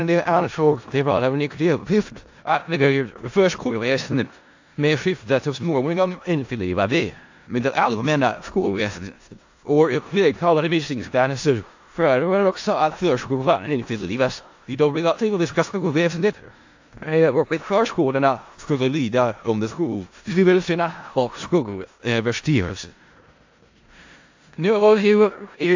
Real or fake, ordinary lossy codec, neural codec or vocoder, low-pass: fake; AAC, 48 kbps; codec, 16 kHz in and 24 kHz out, 0.4 kbps, LongCat-Audio-Codec, four codebook decoder; 7.2 kHz